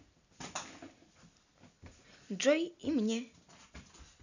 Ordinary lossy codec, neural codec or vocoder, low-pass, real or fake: none; none; 7.2 kHz; real